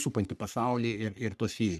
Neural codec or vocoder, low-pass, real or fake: codec, 44.1 kHz, 3.4 kbps, Pupu-Codec; 14.4 kHz; fake